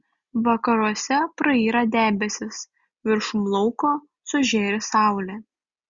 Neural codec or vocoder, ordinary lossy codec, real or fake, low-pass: none; Opus, 64 kbps; real; 7.2 kHz